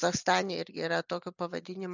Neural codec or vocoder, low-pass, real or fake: none; 7.2 kHz; real